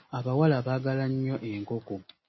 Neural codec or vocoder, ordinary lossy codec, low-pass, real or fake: none; MP3, 24 kbps; 7.2 kHz; real